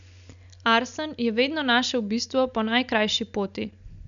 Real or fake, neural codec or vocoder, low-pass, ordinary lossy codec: real; none; 7.2 kHz; none